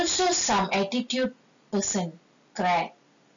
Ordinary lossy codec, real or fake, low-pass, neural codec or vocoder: none; real; 7.2 kHz; none